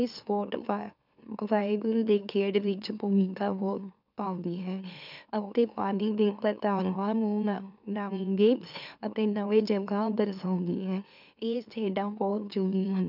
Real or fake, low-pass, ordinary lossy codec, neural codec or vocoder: fake; 5.4 kHz; none; autoencoder, 44.1 kHz, a latent of 192 numbers a frame, MeloTTS